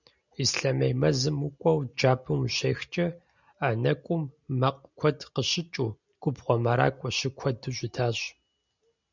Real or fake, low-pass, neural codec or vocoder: real; 7.2 kHz; none